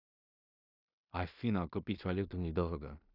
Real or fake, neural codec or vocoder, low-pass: fake; codec, 16 kHz in and 24 kHz out, 0.4 kbps, LongCat-Audio-Codec, two codebook decoder; 5.4 kHz